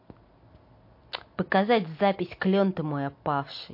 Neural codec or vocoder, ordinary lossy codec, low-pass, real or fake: none; MP3, 32 kbps; 5.4 kHz; real